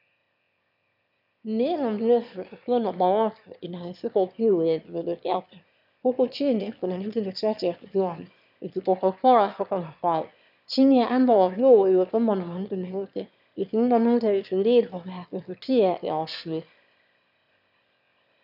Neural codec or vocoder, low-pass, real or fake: autoencoder, 22.05 kHz, a latent of 192 numbers a frame, VITS, trained on one speaker; 5.4 kHz; fake